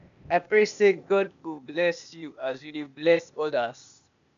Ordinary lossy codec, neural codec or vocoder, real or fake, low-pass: none; codec, 16 kHz, 0.8 kbps, ZipCodec; fake; 7.2 kHz